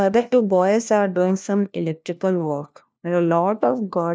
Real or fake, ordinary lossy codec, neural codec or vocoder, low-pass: fake; none; codec, 16 kHz, 1 kbps, FunCodec, trained on LibriTTS, 50 frames a second; none